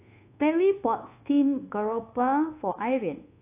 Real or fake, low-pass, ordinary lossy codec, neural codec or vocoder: fake; 3.6 kHz; AAC, 24 kbps; codec, 24 kHz, 1.2 kbps, DualCodec